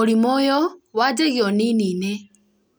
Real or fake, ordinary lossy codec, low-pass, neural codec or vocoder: real; none; none; none